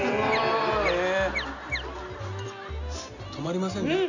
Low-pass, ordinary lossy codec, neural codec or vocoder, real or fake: 7.2 kHz; none; none; real